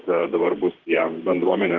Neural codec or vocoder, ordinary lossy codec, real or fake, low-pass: vocoder, 44.1 kHz, 128 mel bands, Pupu-Vocoder; Opus, 16 kbps; fake; 7.2 kHz